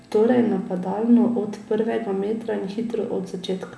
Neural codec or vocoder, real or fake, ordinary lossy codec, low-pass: none; real; none; none